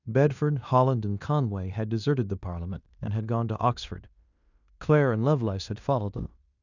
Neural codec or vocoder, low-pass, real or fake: codec, 16 kHz in and 24 kHz out, 0.9 kbps, LongCat-Audio-Codec, fine tuned four codebook decoder; 7.2 kHz; fake